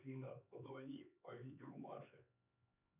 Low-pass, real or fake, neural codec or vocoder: 3.6 kHz; fake; codec, 16 kHz, 4 kbps, X-Codec, HuBERT features, trained on LibriSpeech